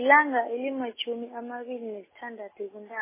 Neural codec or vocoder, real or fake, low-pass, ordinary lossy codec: none; real; 3.6 kHz; MP3, 16 kbps